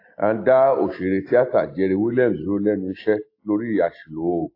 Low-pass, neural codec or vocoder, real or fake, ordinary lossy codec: 5.4 kHz; none; real; AAC, 32 kbps